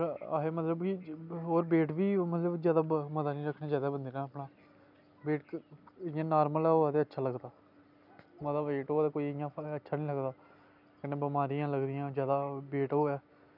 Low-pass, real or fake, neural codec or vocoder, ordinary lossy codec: 5.4 kHz; real; none; none